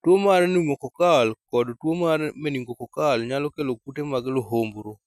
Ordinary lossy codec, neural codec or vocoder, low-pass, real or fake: none; none; 14.4 kHz; real